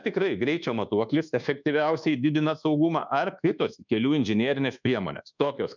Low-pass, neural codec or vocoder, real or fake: 7.2 kHz; codec, 24 kHz, 1.2 kbps, DualCodec; fake